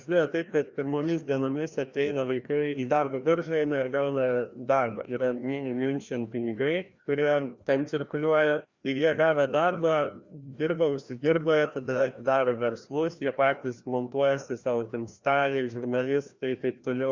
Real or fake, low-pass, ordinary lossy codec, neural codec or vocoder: fake; 7.2 kHz; Opus, 64 kbps; codec, 16 kHz, 1 kbps, FreqCodec, larger model